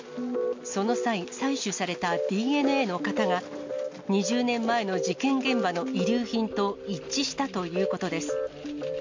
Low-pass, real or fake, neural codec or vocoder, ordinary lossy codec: 7.2 kHz; real; none; MP3, 48 kbps